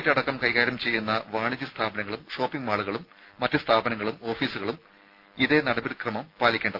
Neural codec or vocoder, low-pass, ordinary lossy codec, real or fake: none; 5.4 kHz; Opus, 32 kbps; real